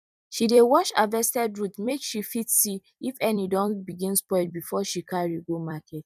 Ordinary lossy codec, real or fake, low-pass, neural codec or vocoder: none; fake; 14.4 kHz; vocoder, 44.1 kHz, 128 mel bands every 256 samples, BigVGAN v2